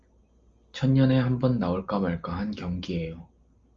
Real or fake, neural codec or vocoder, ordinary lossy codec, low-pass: real; none; Opus, 32 kbps; 7.2 kHz